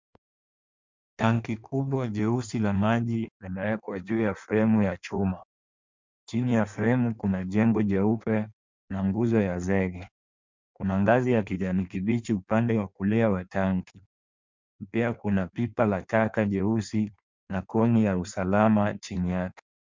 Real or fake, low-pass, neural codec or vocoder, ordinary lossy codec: fake; 7.2 kHz; codec, 16 kHz in and 24 kHz out, 1.1 kbps, FireRedTTS-2 codec; MP3, 64 kbps